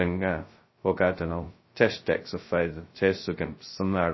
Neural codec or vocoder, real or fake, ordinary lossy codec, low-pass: codec, 16 kHz, 0.2 kbps, FocalCodec; fake; MP3, 24 kbps; 7.2 kHz